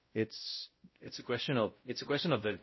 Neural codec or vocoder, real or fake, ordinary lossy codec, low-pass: codec, 16 kHz, 0.5 kbps, X-Codec, WavLM features, trained on Multilingual LibriSpeech; fake; MP3, 24 kbps; 7.2 kHz